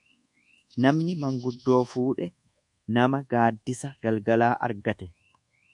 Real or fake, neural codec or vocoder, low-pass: fake; codec, 24 kHz, 1.2 kbps, DualCodec; 10.8 kHz